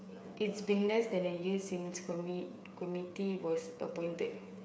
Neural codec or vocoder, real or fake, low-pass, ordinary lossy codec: codec, 16 kHz, 8 kbps, FreqCodec, smaller model; fake; none; none